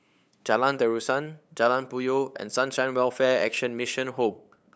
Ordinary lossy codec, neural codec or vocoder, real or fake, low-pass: none; codec, 16 kHz, 8 kbps, FunCodec, trained on LibriTTS, 25 frames a second; fake; none